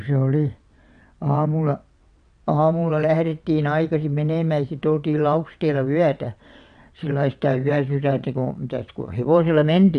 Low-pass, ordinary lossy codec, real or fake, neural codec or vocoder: 9.9 kHz; none; fake; vocoder, 22.05 kHz, 80 mel bands, WaveNeXt